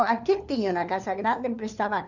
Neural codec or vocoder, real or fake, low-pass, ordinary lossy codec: codec, 16 kHz, 4 kbps, FunCodec, trained on LibriTTS, 50 frames a second; fake; 7.2 kHz; none